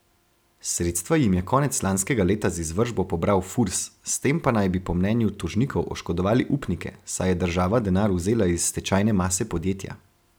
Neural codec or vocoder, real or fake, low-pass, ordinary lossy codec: none; real; none; none